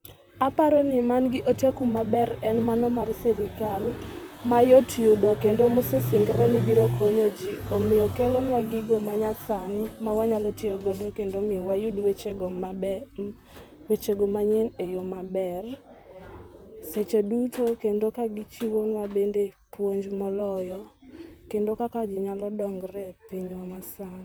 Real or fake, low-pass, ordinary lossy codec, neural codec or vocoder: fake; none; none; vocoder, 44.1 kHz, 128 mel bands, Pupu-Vocoder